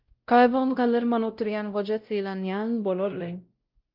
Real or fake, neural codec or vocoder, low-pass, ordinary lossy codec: fake; codec, 16 kHz, 0.5 kbps, X-Codec, WavLM features, trained on Multilingual LibriSpeech; 5.4 kHz; Opus, 24 kbps